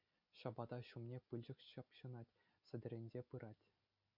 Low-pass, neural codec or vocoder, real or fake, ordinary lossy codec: 5.4 kHz; none; real; MP3, 48 kbps